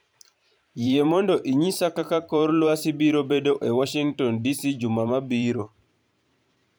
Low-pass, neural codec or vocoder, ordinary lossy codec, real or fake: none; vocoder, 44.1 kHz, 128 mel bands every 512 samples, BigVGAN v2; none; fake